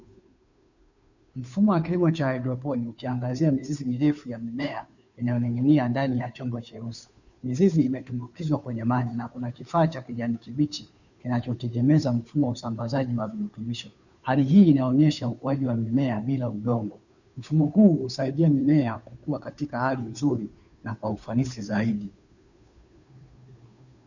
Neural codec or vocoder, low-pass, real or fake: codec, 16 kHz, 2 kbps, FunCodec, trained on Chinese and English, 25 frames a second; 7.2 kHz; fake